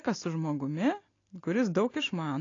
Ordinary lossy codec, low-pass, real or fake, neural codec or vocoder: AAC, 32 kbps; 7.2 kHz; real; none